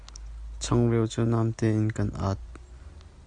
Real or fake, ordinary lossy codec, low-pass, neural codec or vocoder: real; Opus, 64 kbps; 9.9 kHz; none